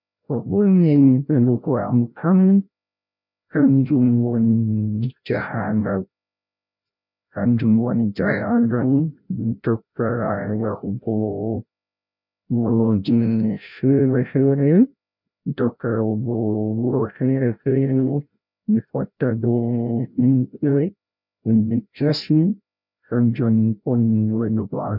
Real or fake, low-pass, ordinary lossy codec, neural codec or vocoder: fake; 5.4 kHz; none; codec, 16 kHz, 0.5 kbps, FreqCodec, larger model